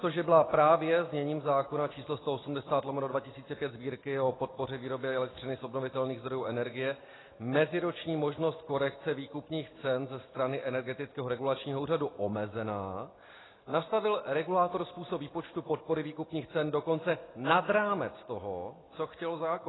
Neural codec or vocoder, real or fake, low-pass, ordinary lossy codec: none; real; 7.2 kHz; AAC, 16 kbps